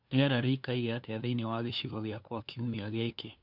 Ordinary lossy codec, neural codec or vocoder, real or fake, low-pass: none; codec, 16 kHz, 2 kbps, FunCodec, trained on LibriTTS, 25 frames a second; fake; 5.4 kHz